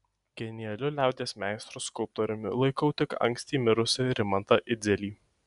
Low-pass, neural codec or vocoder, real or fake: 10.8 kHz; none; real